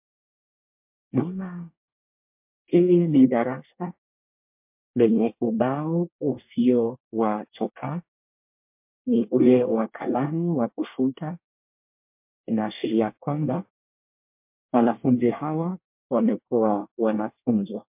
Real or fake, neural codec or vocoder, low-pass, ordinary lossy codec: fake; codec, 24 kHz, 1 kbps, SNAC; 3.6 kHz; MP3, 32 kbps